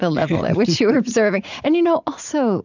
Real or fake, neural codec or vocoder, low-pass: fake; vocoder, 44.1 kHz, 80 mel bands, Vocos; 7.2 kHz